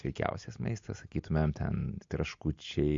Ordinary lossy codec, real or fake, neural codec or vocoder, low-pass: MP3, 48 kbps; real; none; 7.2 kHz